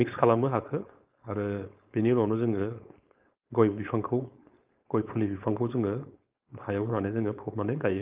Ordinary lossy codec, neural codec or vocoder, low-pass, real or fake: Opus, 24 kbps; codec, 16 kHz, 4.8 kbps, FACodec; 3.6 kHz; fake